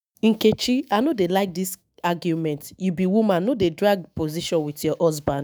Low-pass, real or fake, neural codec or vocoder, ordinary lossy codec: none; fake; autoencoder, 48 kHz, 128 numbers a frame, DAC-VAE, trained on Japanese speech; none